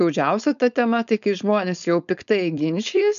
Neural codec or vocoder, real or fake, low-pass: codec, 16 kHz, 4.8 kbps, FACodec; fake; 7.2 kHz